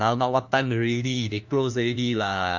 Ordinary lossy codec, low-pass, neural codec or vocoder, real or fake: none; 7.2 kHz; codec, 16 kHz, 1 kbps, FunCodec, trained on LibriTTS, 50 frames a second; fake